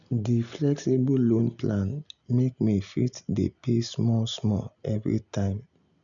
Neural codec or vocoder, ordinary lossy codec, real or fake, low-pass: none; none; real; 7.2 kHz